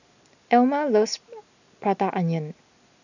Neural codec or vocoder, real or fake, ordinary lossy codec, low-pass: none; real; none; 7.2 kHz